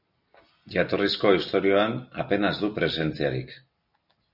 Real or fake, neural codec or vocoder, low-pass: real; none; 5.4 kHz